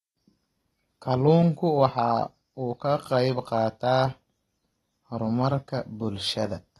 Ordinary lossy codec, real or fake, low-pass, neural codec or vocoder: AAC, 32 kbps; real; 19.8 kHz; none